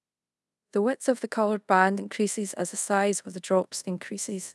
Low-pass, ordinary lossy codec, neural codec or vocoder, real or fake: none; none; codec, 24 kHz, 0.5 kbps, DualCodec; fake